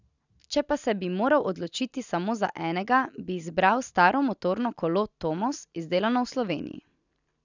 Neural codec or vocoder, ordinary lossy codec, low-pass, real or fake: none; none; 7.2 kHz; real